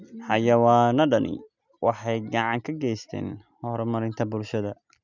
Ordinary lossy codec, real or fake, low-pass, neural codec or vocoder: none; real; 7.2 kHz; none